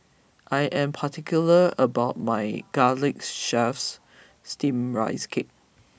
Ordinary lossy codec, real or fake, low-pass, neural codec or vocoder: none; real; none; none